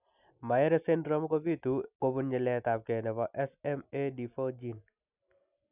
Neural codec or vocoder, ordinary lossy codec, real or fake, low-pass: none; none; real; 3.6 kHz